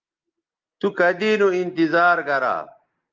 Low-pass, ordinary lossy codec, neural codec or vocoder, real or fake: 7.2 kHz; Opus, 24 kbps; none; real